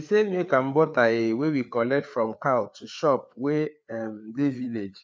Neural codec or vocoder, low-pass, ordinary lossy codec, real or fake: codec, 16 kHz, 4 kbps, FreqCodec, larger model; none; none; fake